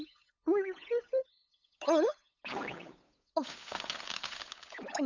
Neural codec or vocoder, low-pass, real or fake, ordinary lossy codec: codec, 16 kHz, 8 kbps, FunCodec, trained on LibriTTS, 25 frames a second; 7.2 kHz; fake; none